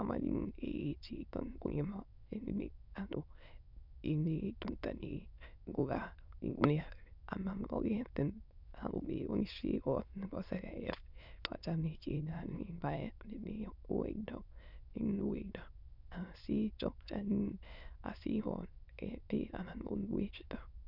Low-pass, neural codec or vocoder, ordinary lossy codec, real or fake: 5.4 kHz; autoencoder, 22.05 kHz, a latent of 192 numbers a frame, VITS, trained on many speakers; none; fake